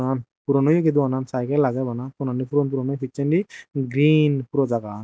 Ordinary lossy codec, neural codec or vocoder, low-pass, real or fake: none; none; none; real